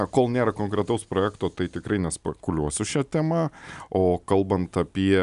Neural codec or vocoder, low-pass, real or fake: none; 10.8 kHz; real